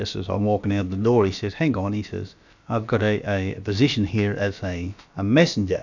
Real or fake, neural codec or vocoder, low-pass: fake; codec, 16 kHz, about 1 kbps, DyCAST, with the encoder's durations; 7.2 kHz